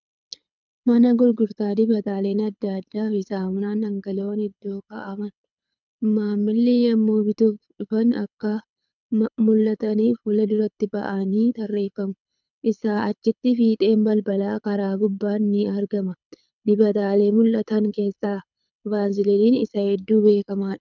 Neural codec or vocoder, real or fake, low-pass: codec, 24 kHz, 6 kbps, HILCodec; fake; 7.2 kHz